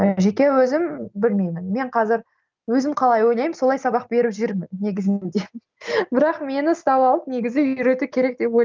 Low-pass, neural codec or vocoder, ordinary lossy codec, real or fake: 7.2 kHz; none; Opus, 24 kbps; real